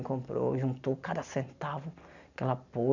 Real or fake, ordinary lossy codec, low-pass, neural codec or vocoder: real; none; 7.2 kHz; none